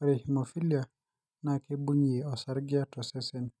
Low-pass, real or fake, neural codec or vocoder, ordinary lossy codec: none; real; none; none